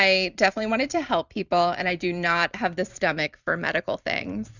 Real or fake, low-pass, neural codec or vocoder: fake; 7.2 kHz; vocoder, 44.1 kHz, 128 mel bands every 256 samples, BigVGAN v2